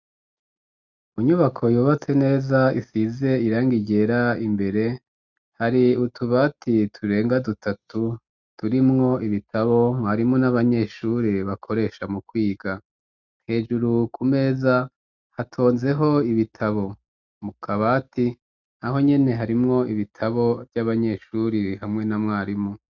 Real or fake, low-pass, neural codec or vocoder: real; 7.2 kHz; none